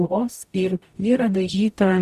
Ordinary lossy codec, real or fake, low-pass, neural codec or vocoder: Opus, 64 kbps; fake; 14.4 kHz; codec, 44.1 kHz, 0.9 kbps, DAC